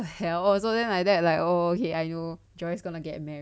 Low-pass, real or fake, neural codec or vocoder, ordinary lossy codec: none; real; none; none